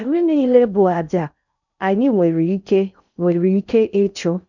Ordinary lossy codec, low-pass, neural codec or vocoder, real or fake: none; 7.2 kHz; codec, 16 kHz in and 24 kHz out, 0.6 kbps, FocalCodec, streaming, 4096 codes; fake